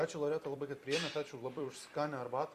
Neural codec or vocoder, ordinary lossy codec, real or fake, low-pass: vocoder, 44.1 kHz, 128 mel bands every 256 samples, BigVGAN v2; Opus, 64 kbps; fake; 14.4 kHz